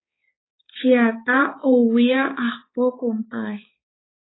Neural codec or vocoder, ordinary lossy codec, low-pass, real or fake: codec, 16 kHz, 4 kbps, X-Codec, HuBERT features, trained on balanced general audio; AAC, 16 kbps; 7.2 kHz; fake